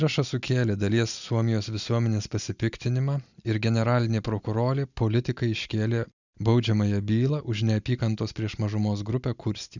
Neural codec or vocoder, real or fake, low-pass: none; real; 7.2 kHz